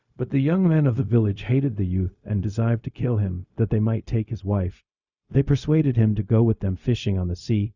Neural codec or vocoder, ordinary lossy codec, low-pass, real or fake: codec, 16 kHz, 0.4 kbps, LongCat-Audio-Codec; Opus, 64 kbps; 7.2 kHz; fake